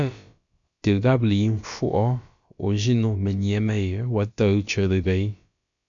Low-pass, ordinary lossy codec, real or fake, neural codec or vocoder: 7.2 kHz; MP3, 64 kbps; fake; codec, 16 kHz, about 1 kbps, DyCAST, with the encoder's durations